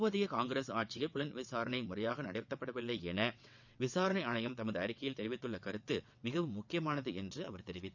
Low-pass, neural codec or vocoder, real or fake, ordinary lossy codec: 7.2 kHz; vocoder, 22.05 kHz, 80 mel bands, WaveNeXt; fake; none